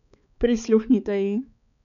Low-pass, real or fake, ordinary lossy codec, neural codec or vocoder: 7.2 kHz; fake; none; codec, 16 kHz, 4 kbps, X-Codec, HuBERT features, trained on balanced general audio